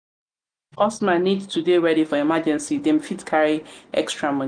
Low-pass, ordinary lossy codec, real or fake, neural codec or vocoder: 9.9 kHz; none; real; none